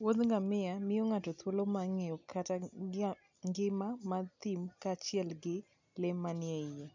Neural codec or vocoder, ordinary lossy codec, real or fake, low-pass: none; none; real; 7.2 kHz